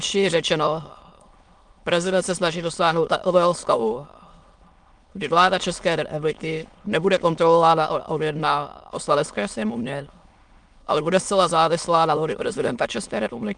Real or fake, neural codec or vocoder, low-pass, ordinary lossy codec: fake; autoencoder, 22.05 kHz, a latent of 192 numbers a frame, VITS, trained on many speakers; 9.9 kHz; Opus, 32 kbps